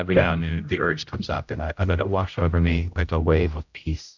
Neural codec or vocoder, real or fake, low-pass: codec, 16 kHz, 0.5 kbps, X-Codec, HuBERT features, trained on general audio; fake; 7.2 kHz